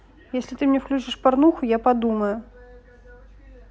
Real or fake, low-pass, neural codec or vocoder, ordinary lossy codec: real; none; none; none